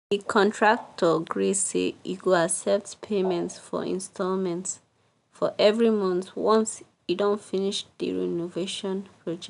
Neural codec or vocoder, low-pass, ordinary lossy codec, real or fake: none; 10.8 kHz; none; real